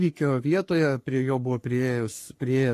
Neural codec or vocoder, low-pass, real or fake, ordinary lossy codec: codec, 44.1 kHz, 3.4 kbps, Pupu-Codec; 14.4 kHz; fake; AAC, 48 kbps